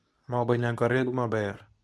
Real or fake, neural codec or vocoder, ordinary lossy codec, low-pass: fake; codec, 24 kHz, 0.9 kbps, WavTokenizer, medium speech release version 2; none; none